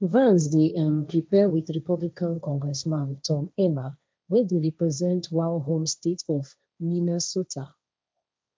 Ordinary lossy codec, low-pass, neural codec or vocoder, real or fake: none; none; codec, 16 kHz, 1.1 kbps, Voila-Tokenizer; fake